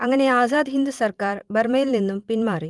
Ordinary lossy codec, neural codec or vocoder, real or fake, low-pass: Opus, 24 kbps; vocoder, 44.1 kHz, 128 mel bands every 512 samples, BigVGAN v2; fake; 10.8 kHz